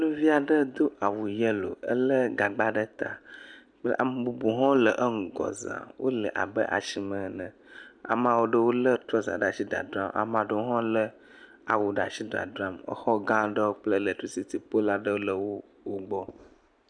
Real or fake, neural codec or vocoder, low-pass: real; none; 9.9 kHz